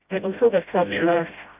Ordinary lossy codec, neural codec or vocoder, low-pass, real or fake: none; codec, 16 kHz, 0.5 kbps, FreqCodec, smaller model; 3.6 kHz; fake